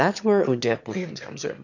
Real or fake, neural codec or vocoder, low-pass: fake; autoencoder, 22.05 kHz, a latent of 192 numbers a frame, VITS, trained on one speaker; 7.2 kHz